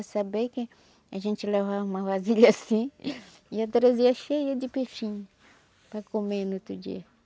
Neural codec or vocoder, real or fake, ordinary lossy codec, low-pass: none; real; none; none